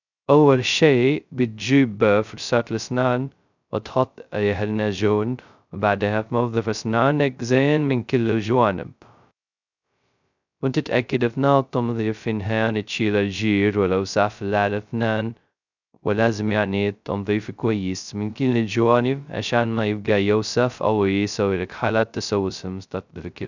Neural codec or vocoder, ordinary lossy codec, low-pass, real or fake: codec, 16 kHz, 0.2 kbps, FocalCodec; none; 7.2 kHz; fake